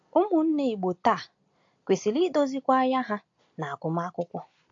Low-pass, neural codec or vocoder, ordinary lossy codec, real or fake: 7.2 kHz; none; AAC, 48 kbps; real